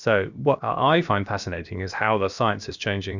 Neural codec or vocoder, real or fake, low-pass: codec, 16 kHz, about 1 kbps, DyCAST, with the encoder's durations; fake; 7.2 kHz